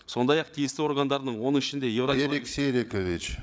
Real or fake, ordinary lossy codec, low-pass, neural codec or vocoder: fake; none; none; codec, 16 kHz, 8 kbps, FreqCodec, larger model